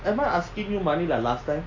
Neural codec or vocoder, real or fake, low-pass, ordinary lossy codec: none; real; 7.2 kHz; AAC, 32 kbps